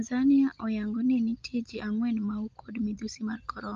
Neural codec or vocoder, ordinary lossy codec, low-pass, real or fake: none; Opus, 24 kbps; 7.2 kHz; real